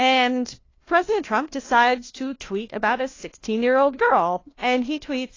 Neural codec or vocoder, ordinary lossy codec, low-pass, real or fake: codec, 16 kHz, 1 kbps, FunCodec, trained on LibriTTS, 50 frames a second; AAC, 32 kbps; 7.2 kHz; fake